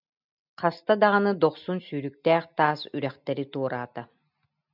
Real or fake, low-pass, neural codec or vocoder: real; 5.4 kHz; none